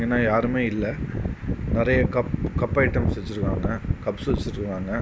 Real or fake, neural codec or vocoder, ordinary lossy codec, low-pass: real; none; none; none